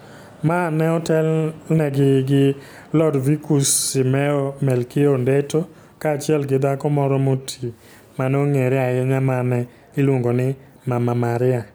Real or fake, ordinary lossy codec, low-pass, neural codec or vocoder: real; none; none; none